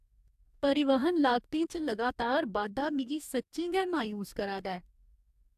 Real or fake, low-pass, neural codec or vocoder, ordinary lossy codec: fake; 14.4 kHz; codec, 44.1 kHz, 2.6 kbps, DAC; AAC, 96 kbps